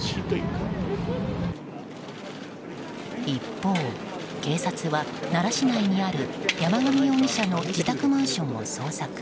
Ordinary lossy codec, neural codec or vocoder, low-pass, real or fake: none; none; none; real